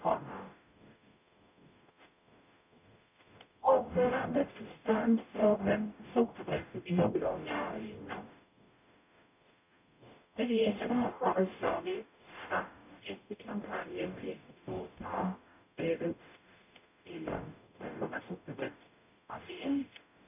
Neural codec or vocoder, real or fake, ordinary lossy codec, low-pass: codec, 44.1 kHz, 0.9 kbps, DAC; fake; none; 3.6 kHz